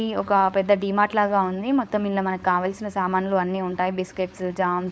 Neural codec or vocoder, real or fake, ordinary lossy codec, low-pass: codec, 16 kHz, 4.8 kbps, FACodec; fake; none; none